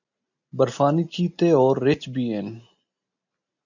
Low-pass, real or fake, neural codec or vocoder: 7.2 kHz; real; none